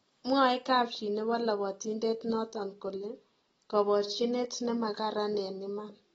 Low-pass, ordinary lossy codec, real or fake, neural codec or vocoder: 19.8 kHz; AAC, 24 kbps; real; none